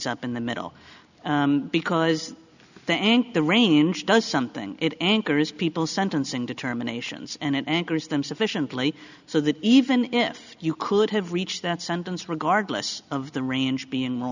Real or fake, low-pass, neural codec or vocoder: real; 7.2 kHz; none